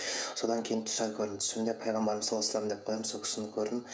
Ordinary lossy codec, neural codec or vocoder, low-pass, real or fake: none; codec, 16 kHz, 16 kbps, FreqCodec, smaller model; none; fake